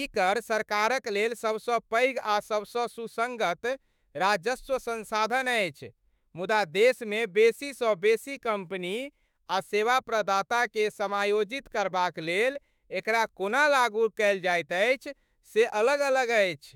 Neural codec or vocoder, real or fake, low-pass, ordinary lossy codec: autoencoder, 48 kHz, 32 numbers a frame, DAC-VAE, trained on Japanese speech; fake; 19.8 kHz; none